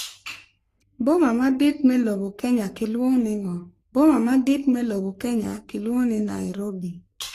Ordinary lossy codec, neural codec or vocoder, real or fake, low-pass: AAC, 48 kbps; codec, 44.1 kHz, 3.4 kbps, Pupu-Codec; fake; 14.4 kHz